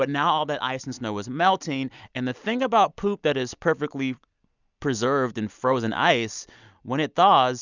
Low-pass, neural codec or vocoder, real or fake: 7.2 kHz; none; real